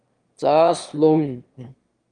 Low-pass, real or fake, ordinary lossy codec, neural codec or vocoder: 9.9 kHz; fake; Opus, 32 kbps; autoencoder, 22.05 kHz, a latent of 192 numbers a frame, VITS, trained on one speaker